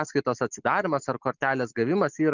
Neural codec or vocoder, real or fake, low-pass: none; real; 7.2 kHz